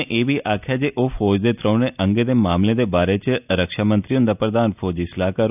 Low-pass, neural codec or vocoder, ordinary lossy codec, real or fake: 3.6 kHz; none; none; real